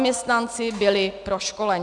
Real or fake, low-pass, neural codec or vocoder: real; 10.8 kHz; none